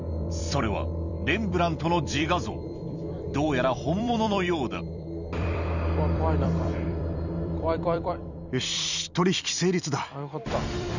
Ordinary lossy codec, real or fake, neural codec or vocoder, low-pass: none; fake; vocoder, 44.1 kHz, 128 mel bands every 256 samples, BigVGAN v2; 7.2 kHz